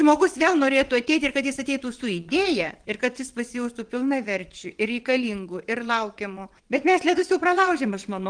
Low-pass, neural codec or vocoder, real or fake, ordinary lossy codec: 9.9 kHz; vocoder, 22.05 kHz, 80 mel bands, WaveNeXt; fake; Opus, 24 kbps